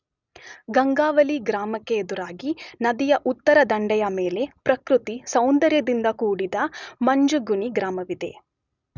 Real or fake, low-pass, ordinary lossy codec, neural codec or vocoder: real; 7.2 kHz; none; none